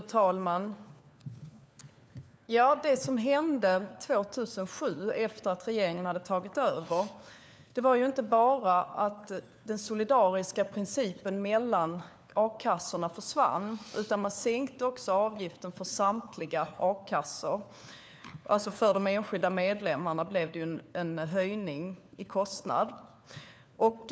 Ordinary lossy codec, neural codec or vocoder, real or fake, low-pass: none; codec, 16 kHz, 4 kbps, FunCodec, trained on LibriTTS, 50 frames a second; fake; none